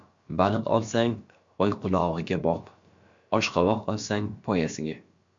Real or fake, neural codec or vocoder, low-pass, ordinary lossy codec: fake; codec, 16 kHz, about 1 kbps, DyCAST, with the encoder's durations; 7.2 kHz; MP3, 48 kbps